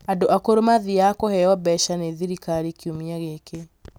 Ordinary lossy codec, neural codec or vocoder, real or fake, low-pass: none; none; real; none